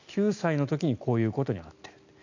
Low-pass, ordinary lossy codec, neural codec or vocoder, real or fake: 7.2 kHz; none; none; real